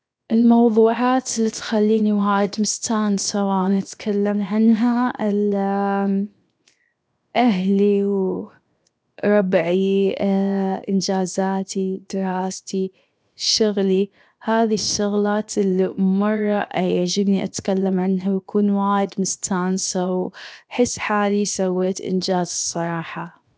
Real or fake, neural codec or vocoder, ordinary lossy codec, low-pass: fake; codec, 16 kHz, 0.7 kbps, FocalCodec; none; none